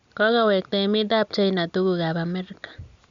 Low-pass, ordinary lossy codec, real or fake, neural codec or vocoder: 7.2 kHz; Opus, 64 kbps; real; none